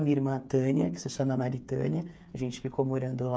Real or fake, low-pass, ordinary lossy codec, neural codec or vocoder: fake; none; none; codec, 16 kHz, 8 kbps, FreqCodec, smaller model